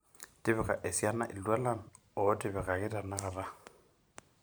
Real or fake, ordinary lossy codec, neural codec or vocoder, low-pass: fake; none; vocoder, 44.1 kHz, 128 mel bands every 512 samples, BigVGAN v2; none